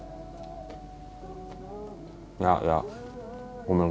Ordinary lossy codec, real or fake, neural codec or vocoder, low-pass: none; real; none; none